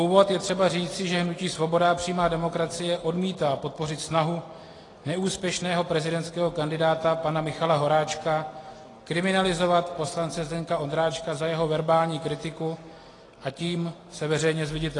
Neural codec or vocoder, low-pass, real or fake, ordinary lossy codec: none; 10.8 kHz; real; AAC, 32 kbps